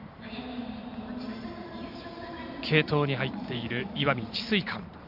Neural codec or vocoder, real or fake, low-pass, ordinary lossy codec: vocoder, 44.1 kHz, 128 mel bands every 512 samples, BigVGAN v2; fake; 5.4 kHz; none